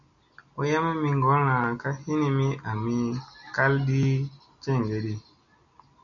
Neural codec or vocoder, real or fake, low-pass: none; real; 7.2 kHz